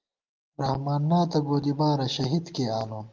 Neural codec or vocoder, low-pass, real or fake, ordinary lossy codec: none; 7.2 kHz; real; Opus, 24 kbps